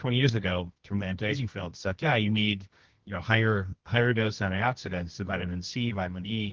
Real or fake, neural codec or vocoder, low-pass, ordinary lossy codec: fake; codec, 24 kHz, 0.9 kbps, WavTokenizer, medium music audio release; 7.2 kHz; Opus, 16 kbps